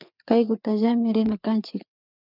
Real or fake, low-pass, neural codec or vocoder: fake; 5.4 kHz; codec, 16 kHz, 4 kbps, FreqCodec, larger model